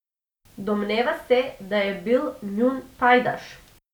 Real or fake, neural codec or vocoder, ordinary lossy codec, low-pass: real; none; none; 19.8 kHz